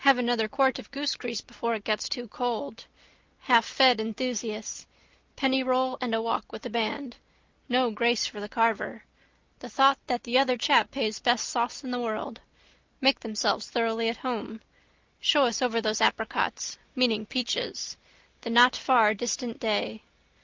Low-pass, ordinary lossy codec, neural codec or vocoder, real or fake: 7.2 kHz; Opus, 32 kbps; none; real